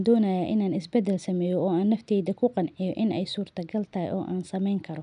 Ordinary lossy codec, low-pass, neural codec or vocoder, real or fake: none; 9.9 kHz; none; real